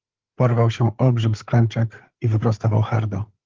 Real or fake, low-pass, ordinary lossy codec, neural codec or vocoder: fake; 7.2 kHz; Opus, 16 kbps; codec, 16 kHz, 8 kbps, FreqCodec, larger model